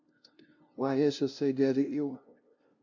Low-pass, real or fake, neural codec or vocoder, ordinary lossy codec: 7.2 kHz; fake; codec, 16 kHz, 0.5 kbps, FunCodec, trained on LibriTTS, 25 frames a second; AAC, 48 kbps